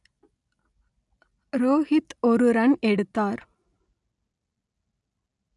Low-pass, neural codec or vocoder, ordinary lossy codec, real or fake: 10.8 kHz; none; none; real